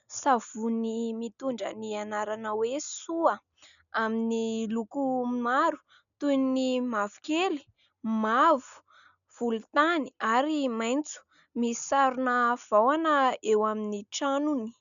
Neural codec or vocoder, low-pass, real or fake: none; 7.2 kHz; real